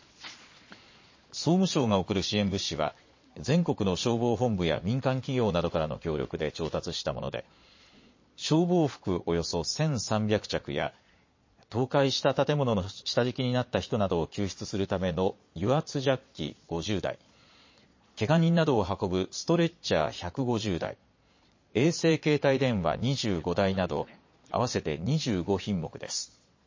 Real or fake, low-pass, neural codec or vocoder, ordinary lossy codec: fake; 7.2 kHz; vocoder, 22.05 kHz, 80 mel bands, Vocos; MP3, 32 kbps